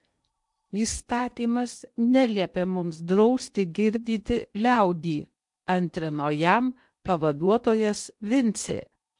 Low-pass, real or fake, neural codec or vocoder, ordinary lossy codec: 10.8 kHz; fake; codec, 16 kHz in and 24 kHz out, 0.8 kbps, FocalCodec, streaming, 65536 codes; MP3, 64 kbps